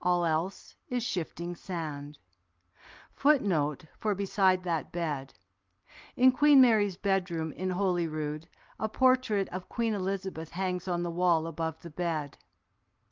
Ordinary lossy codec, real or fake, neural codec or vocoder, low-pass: Opus, 32 kbps; real; none; 7.2 kHz